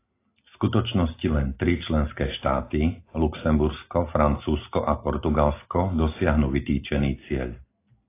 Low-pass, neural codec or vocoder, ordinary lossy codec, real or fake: 3.6 kHz; none; AAC, 24 kbps; real